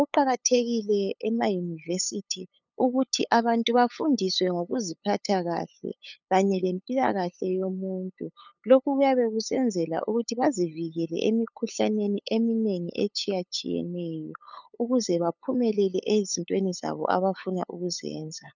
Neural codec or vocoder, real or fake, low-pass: codec, 16 kHz, 8 kbps, FunCodec, trained on LibriTTS, 25 frames a second; fake; 7.2 kHz